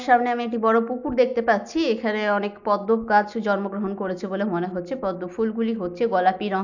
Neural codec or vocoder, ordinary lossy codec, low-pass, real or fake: none; none; 7.2 kHz; real